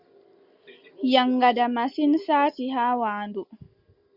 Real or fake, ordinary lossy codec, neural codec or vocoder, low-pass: real; Opus, 64 kbps; none; 5.4 kHz